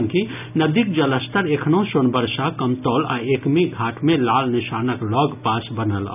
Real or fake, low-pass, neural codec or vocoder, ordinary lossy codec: real; 3.6 kHz; none; none